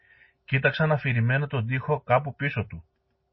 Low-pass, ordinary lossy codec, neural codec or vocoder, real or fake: 7.2 kHz; MP3, 24 kbps; none; real